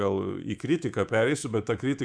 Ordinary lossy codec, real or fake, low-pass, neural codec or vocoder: Opus, 64 kbps; fake; 9.9 kHz; codec, 24 kHz, 3.1 kbps, DualCodec